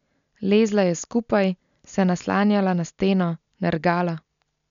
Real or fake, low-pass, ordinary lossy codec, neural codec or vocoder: real; 7.2 kHz; none; none